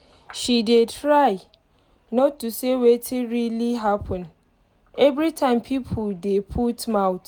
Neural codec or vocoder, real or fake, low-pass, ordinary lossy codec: none; real; none; none